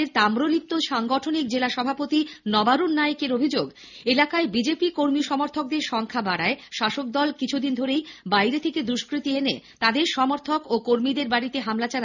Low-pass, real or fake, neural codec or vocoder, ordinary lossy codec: 7.2 kHz; real; none; none